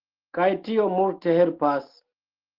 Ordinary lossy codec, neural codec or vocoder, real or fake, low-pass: Opus, 16 kbps; none; real; 5.4 kHz